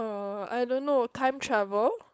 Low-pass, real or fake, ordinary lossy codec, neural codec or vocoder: none; fake; none; codec, 16 kHz, 4.8 kbps, FACodec